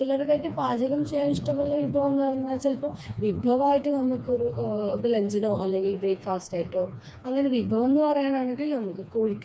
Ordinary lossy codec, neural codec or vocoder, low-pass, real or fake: none; codec, 16 kHz, 2 kbps, FreqCodec, smaller model; none; fake